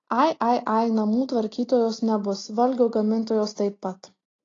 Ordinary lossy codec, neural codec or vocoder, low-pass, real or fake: AAC, 32 kbps; none; 7.2 kHz; real